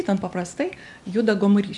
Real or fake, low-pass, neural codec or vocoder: real; 10.8 kHz; none